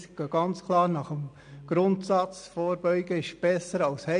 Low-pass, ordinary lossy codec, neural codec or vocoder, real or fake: 9.9 kHz; AAC, 96 kbps; none; real